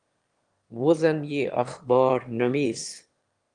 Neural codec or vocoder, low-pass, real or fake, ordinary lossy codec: autoencoder, 22.05 kHz, a latent of 192 numbers a frame, VITS, trained on one speaker; 9.9 kHz; fake; Opus, 24 kbps